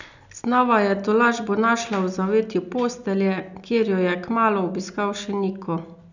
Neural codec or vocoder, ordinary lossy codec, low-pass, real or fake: none; none; 7.2 kHz; real